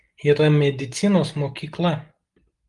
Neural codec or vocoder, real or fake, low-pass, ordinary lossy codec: none; real; 10.8 kHz; Opus, 24 kbps